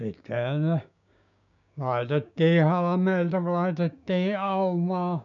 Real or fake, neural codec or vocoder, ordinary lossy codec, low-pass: fake; codec, 16 kHz, 6 kbps, DAC; none; 7.2 kHz